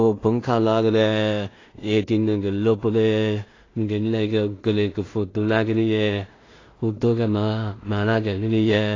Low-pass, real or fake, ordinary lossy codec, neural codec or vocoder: 7.2 kHz; fake; AAC, 32 kbps; codec, 16 kHz in and 24 kHz out, 0.4 kbps, LongCat-Audio-Codec, two codebook decoder